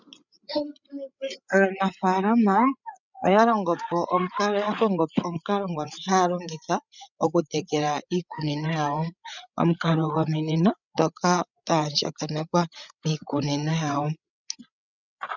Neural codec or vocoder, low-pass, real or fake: codec, 16 kHz, 8 kbps, FreqCodec, larger model; 7.2 kHz; fake